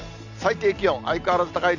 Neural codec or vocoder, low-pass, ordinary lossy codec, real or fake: none; 7.2 kHz; none; real